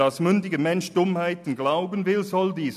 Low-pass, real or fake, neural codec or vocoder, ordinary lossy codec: 14.4 kHz; real; none; MP3, 96 kbps